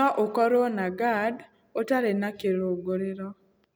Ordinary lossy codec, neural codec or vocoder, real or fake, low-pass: none; none; real; none